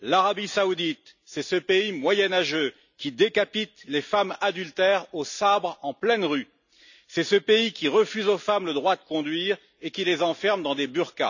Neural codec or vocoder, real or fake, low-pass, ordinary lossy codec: none; real; 7.2 kHz; none